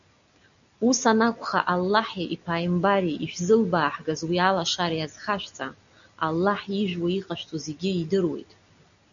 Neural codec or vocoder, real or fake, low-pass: none; real; 7.2 kHz